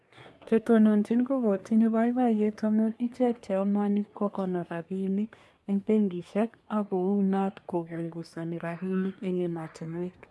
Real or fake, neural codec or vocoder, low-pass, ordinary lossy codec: fake; codec, 24 kHz, 1 kbps, SNAC; none; none